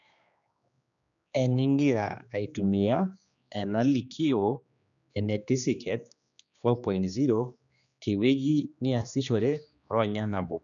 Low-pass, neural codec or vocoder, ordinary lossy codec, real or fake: 7.2 kHz; codec, 16 kHz, 2 kbps, X-Codec, HuBERT features, trained on general audio; none; fake